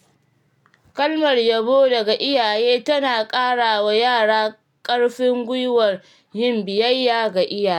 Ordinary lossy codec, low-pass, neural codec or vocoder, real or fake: none; 19.8 kHz; none; real